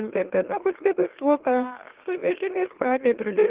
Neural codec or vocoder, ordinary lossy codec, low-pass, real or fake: autoencoder, 44.1 kHz, a latent of 192 numbers a frame, MeloTTS; Opus, 16 kbps; 3.6 kHz; fake